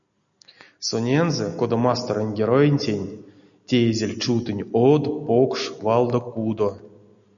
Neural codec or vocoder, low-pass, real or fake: none; 7.2 kHz; real